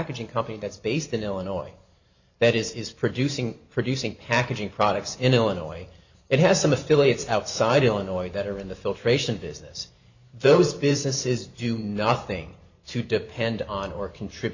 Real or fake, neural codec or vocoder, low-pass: fake; vocoder, 44.1 kHz, 128 mel bands every 512 samples, BigVGAN v2; 7.2 kHz